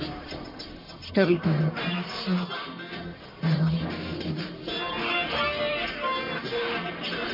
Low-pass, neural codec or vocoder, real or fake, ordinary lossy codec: 5.4 kHz; codec, 44.1 kHz, 1.7 kbps, Pupu-Codec; fake; MP3, 24 kbps